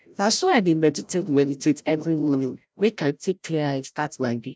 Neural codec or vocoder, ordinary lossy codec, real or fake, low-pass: codec, 16 kHz, 0.5 kbps, FreqCodec, larger model; none; fake; none